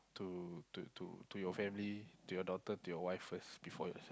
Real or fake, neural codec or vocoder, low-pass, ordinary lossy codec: real; none; none; none